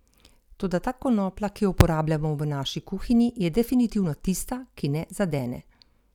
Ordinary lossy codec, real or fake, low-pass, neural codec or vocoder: none; real; 19.8 kHz; none